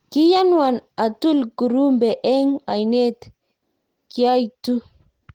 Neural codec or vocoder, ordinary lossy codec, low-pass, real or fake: none; Opus, 16 kbps; 19.8 kHz; real